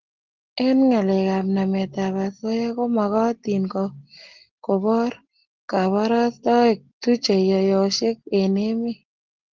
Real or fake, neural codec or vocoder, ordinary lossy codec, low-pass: real; none; Opus, 16 kbps; 7.2 kHz